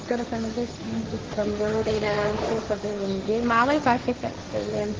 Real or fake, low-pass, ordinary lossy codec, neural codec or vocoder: fake; 7.2 kHz; Opus, 16 kbps; codec, 16 kHz, 1.1 kbps, Voila-Tokenizer